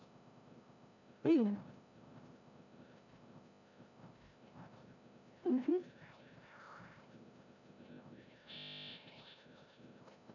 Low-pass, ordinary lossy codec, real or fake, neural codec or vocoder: 7.2 kHz; none; fake; codec, 16 kHz, 0.5 kbps, FreqCodec, larger model